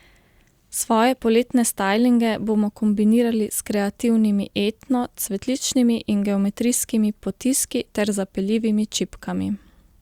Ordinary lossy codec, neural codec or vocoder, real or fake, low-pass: Opus, 64 kbps; none; real; 19.8 kHz